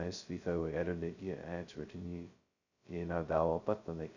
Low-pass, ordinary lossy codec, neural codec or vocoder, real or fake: 7.2 kHz; none; codec, 16 kHz, 0.2 kbps, FocalCodec; fake